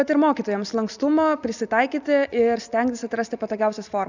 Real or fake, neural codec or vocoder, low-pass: real; none; 7.2 kHz